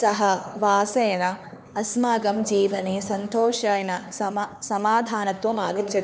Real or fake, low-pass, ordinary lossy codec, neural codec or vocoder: fake; none; none; codec, 16 kHz, 4 kbps, X-Codec, HuBERT features, trained on LibriSpeech